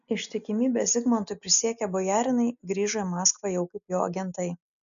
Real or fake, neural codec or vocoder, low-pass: real; none; 7.2 kHz